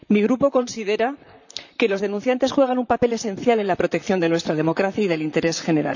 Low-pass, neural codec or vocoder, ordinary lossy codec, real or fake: 7.2 kHz; vocoder, 44.1 kHz, 128 mel bands, Pupu-Vocoder; none; fake